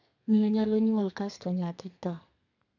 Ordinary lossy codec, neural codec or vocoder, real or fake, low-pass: none; codec, 32 kHz, 1.9 kbps, SNAC; fake; 7.2 kHz